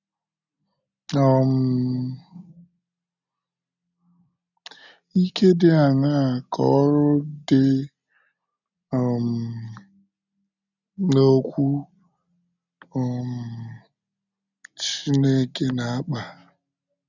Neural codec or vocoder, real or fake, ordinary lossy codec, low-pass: none; real; none; 7.2 kHz